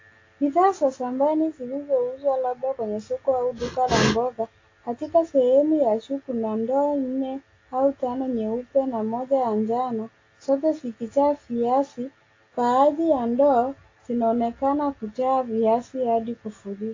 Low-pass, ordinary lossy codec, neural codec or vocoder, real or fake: 7.2 kHz; AAC, 32 kbps; none; real